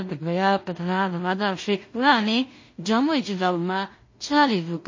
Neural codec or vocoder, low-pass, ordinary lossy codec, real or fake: codec, 16 kHz in and 24 kHz out, 0.4 kbps, LongCat-Audio-Codec, two codebook decoder; 7.2 kHz; MP3, 32 kbps; fake